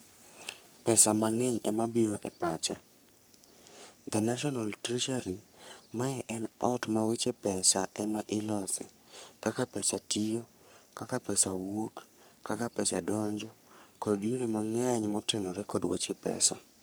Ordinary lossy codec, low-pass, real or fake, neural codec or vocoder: none; none; fake; codec, 44.1 kHz, 3.4 kbps, Pupu-Codec